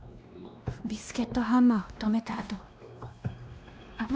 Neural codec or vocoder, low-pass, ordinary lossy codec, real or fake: codec, 16 kHz, 1 kbps, X-Codec, WavLM features, trained on Multilingual LibriSpeech; none; none; fake